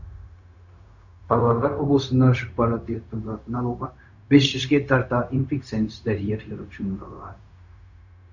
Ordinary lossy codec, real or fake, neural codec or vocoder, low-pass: Opus, 64 kbps; fake; codec, 16 kHz, 0.4 kbps, LongCat-Audio-Codec; 7.2 kHz